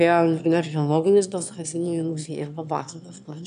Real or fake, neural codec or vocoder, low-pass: fake; autoencoder, 22.05 kHz, a latent of 192 numbers a frame, VITS, trained on one speaker; 9.9 kHz